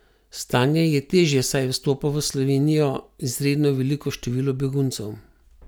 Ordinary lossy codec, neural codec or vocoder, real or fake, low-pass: none; none; real; none